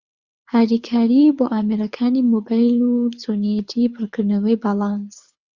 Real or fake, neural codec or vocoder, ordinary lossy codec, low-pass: fake; codec, 24 kHz, 6 kbps, HILCodec; Opus, 64 kbps; 7.2 kHz